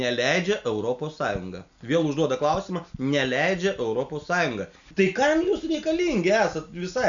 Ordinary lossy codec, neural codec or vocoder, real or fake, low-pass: MP3, 96 kbps; none; real; 7.2 kHz